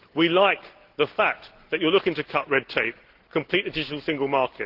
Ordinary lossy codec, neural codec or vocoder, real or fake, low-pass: Opus, 16 kbps; none; real; 5.4 kHz